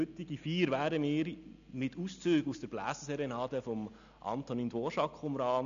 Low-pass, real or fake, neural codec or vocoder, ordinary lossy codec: 7.2 kHz; real; none; none